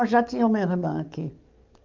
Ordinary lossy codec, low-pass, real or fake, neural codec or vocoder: Opus, 24 kbps; 7.2 kHz; fake; codec, 44.1 kHz, 7.8 kbps, DAC